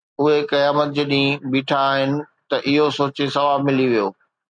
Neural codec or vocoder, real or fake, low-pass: none; real; 9.9 kHz